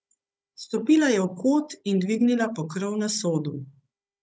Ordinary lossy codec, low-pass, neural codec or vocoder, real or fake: none; none; codec, 16 kHz, 16 kbps, FunCodec, trained on Chinese and English, 50 frames a second; fake